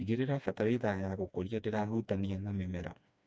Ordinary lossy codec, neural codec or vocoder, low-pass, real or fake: none; codec, 16 kHz, 2 kbps, FreqCodec, smaller model; none; fake